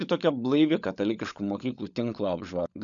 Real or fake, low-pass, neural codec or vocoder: fake; 7.2 kHz; codec, 16 kHz, 16 kbps, FunCodec, trained on LibriTTS, 50 frames a second